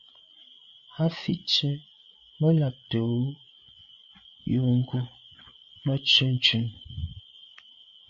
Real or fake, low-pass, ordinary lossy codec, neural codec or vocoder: fake; 7.2 kHz; AAC, 64 kbps; codec, 16 kHz, 8 kbps, FreqCodec, larger model